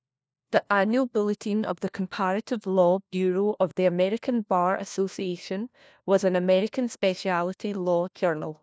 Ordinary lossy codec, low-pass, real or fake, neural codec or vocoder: none; none; fake; codec, 16 kHz, 1 kbps, FunCodec, trained on LibriTTS, 50 frames a second